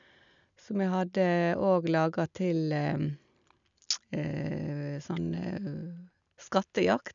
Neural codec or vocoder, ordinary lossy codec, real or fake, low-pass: none; none; real; 7.2 kHz